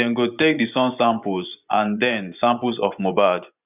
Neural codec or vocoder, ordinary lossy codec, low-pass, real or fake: vocoder, 24 kHz, 100 mel bands, Vocos; none; 3.6 kHz; fake